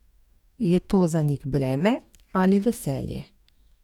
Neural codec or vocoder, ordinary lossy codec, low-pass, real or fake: codec, 44.1 kHz, 2.6 kbps, DAC; none; 19.8 kHz; fake